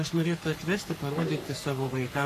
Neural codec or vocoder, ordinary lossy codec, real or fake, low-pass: codec, 44.1 kHz, 3.4 kbps, Pupu-Codec; AAC, 48 kbps; fake; 14.4 kHz